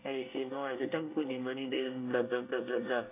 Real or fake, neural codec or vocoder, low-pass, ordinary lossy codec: fake; codec, 24 kHz, 1 kbps, SNAC; 3.6 kHz; AAC, 24 kbps